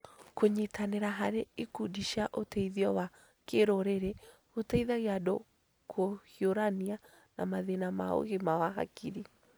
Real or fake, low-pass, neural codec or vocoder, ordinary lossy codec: real; none; none; none